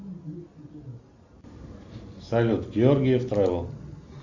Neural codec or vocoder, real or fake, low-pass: none; real; 7.2 kHz